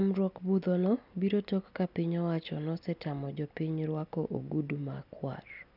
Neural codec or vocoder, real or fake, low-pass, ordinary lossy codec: none; real; 5.4 kHz; none